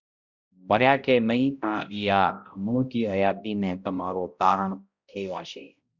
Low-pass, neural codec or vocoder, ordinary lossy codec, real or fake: 7.2 kHz; codec, 16 kHz, 0.5 kbps, X-Codec, HuBERT features, trained on balanced general audio; Opus, 64 kbps; fake